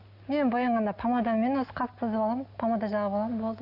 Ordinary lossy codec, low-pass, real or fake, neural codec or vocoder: none; 5.4 kHz; real; none